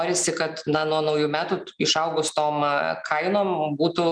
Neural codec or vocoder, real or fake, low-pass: none; real; 9.9 kHz